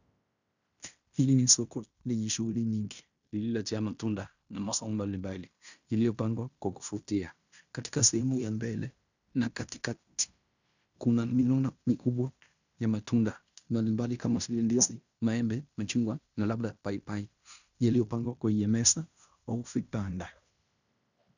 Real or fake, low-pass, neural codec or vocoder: fake; 7.2 kHz; codec, 16 kHz in and 24 kHz out, 0.9 kbps, LongCat-Audio-Codec, fine tuned four codebook decoder